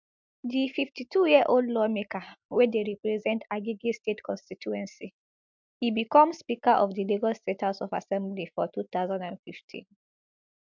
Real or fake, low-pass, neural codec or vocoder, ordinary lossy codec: real; 7.2 kHz; none; MP3, 64 kbps